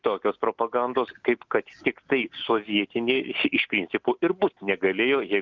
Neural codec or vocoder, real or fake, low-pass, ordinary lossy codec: none; real; 7.2 kHz; Opus, 24 kbps